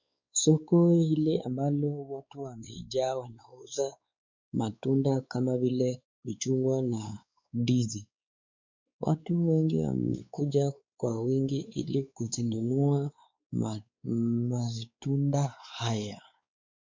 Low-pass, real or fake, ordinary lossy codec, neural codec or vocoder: 7.2 kHz; fake; MP3, 64 kbps; codec, 16 kHz, 4 kbps, X-Codec, WavLM features, trained on Multilingual LibriSpeech